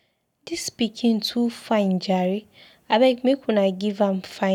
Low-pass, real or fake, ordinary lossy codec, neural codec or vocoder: 19.8 kHz; real; none; none